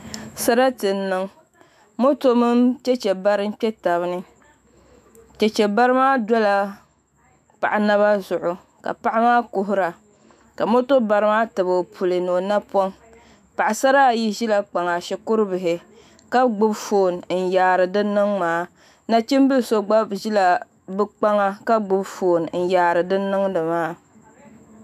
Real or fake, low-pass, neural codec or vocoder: fake; 14.4 kHz; autoencoder, 48 kHz, 128 numbers a frame, DAC-VAE, trained on Japanese speech